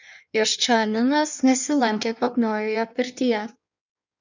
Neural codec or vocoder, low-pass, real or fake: codec, 16 kHz in and 24 kHz out, 1.1 kbps, FireRedTTS-2 codec; 7.2 kHz; fake